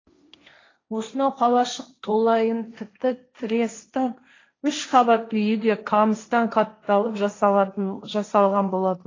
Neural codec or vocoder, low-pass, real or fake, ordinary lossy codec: codec, 16 kHz, 1.1 kbps, Voila-Tokenizer; 7.2 kHz; fake; AAC, 32 kbps